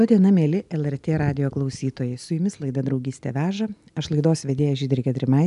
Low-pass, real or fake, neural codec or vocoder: 10.8 kHz; real; none